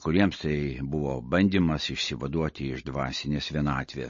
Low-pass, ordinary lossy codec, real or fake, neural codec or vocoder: 7.2 kHz; MP3, 32 kbps; real; none